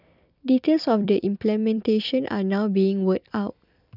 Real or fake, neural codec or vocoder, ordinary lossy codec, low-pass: fake; vocoder, 44.1 kHz, 128 mel bands every 256 samples, BigVGAN v2; none; 5.4 kHz